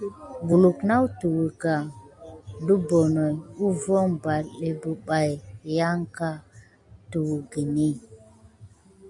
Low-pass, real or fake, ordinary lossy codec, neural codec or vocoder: 10.8 kHz; real; MP3, 96 kbps; none